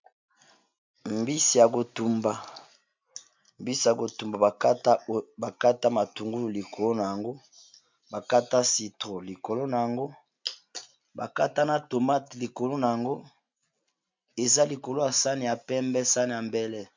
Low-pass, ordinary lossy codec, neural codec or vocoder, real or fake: 7.2 kHz; MP3, 64 kbps; none; real